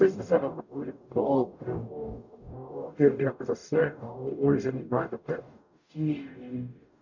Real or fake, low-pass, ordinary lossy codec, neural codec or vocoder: fake; 7.2 kHz; none; codec, 44.1 kHz, 0.9 kbps, DAC